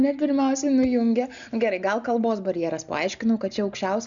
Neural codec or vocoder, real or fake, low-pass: none; real; 7.2 kHz